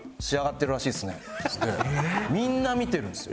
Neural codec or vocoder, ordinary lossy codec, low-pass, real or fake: none; none; none; real